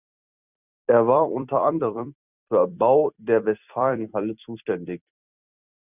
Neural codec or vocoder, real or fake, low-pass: vocoder, 44.1 kHz, 128 mel bands every 256 samples, BigVGAN v2; fake; 3.6 kHz